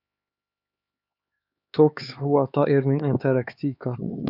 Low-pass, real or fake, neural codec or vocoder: 5.4 kHz; fake; codec, 16 kHz, 4 kbps, X-Codec, HuBERT features, trained on LibriSpeech